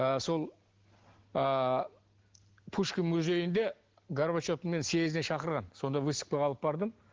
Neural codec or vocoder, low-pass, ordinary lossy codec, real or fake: none; 7.2 kHz; Opus, 16 kbps; real